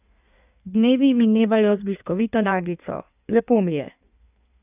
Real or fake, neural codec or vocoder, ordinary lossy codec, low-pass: fake; codec, 16 kHz in and 24 kHz out, 1.1 kbps, FireRedTTS-2 codec; none; 3.6 kHz